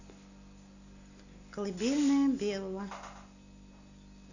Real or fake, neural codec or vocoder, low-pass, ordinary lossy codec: real; none; 7.2 kHz; none